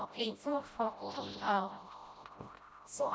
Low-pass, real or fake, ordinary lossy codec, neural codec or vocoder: none; fake; none; codec, 16 kHz, 0.5 kbps, FreqCodec, smaller model